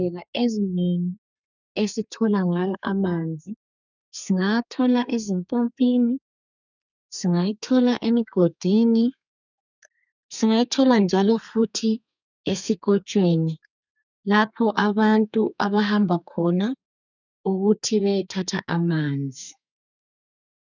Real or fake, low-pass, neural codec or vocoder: fake; 7.2 kHz; codec, 32 kHz, 1.9 kbps, SNAC